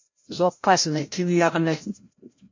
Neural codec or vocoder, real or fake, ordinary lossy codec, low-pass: codec, 16 kHz, 0.5 kbps, FreqCodec, larger model; fake; MP3, 48 kbps; 7.2 kHz